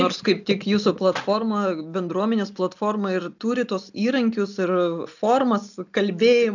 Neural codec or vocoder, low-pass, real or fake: none; 7.2 kHz; real